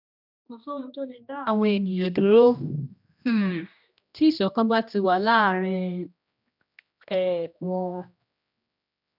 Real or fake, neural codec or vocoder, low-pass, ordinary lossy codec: fake; codec, 16 kHz, 1 kbps, X-Codec, HuBERT features, trained on general audio; 5.4 kHz; none